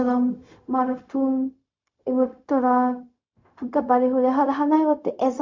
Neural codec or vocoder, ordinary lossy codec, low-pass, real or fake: codec, 16 kHz, 0.4 kbps, LongCat-Audio-Codec; MP3, 48 kbps; 7.2 kHz; fake